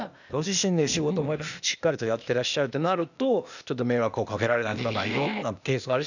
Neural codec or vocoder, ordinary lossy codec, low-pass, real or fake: codec, 16 kHz, 0.8 kbps, ZipCodec; none; 7.2 kHz; fake